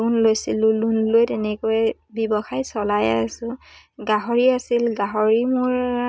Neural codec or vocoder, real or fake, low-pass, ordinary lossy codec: none; real; none; none